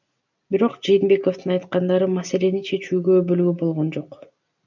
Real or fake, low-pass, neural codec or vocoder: real; 7.2 kHz; none